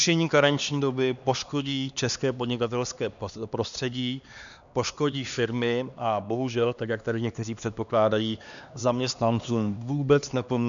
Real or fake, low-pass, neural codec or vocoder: fake; 7.2 kHz; codec, 16 kHz, 2 kbps, X-Codec, HuBERT features, trained on LibriSpeech